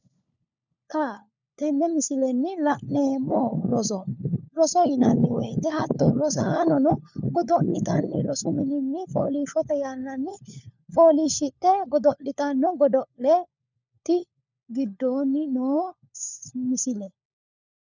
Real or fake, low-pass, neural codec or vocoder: fake; 7.2 kHz; codec, 16 kHz, 4 kbps, FunCodec, trained on LibriTTS, 50 frames a second